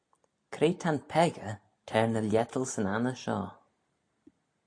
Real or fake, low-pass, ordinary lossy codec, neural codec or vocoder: real; 9.9 kHz; AAC, 48 kbps; none